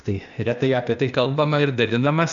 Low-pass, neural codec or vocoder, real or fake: 7.2 kHz; codec, 16 kHz, 0.8 kbps, ZipCodec; fake